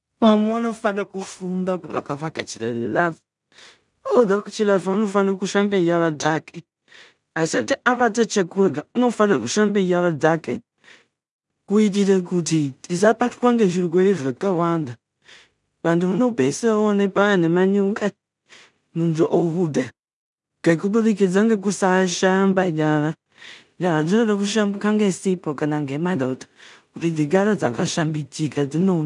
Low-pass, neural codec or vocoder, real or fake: 10.8 kHz; codec, 16 kHz in and 24 kHz out, 0.4 kbps, LongCat-Audio-Codec, two codebook decoder; fake